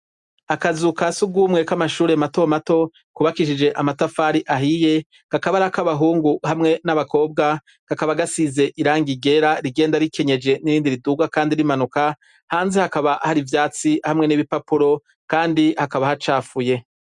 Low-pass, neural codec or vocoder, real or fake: 10.8 kHz; none; real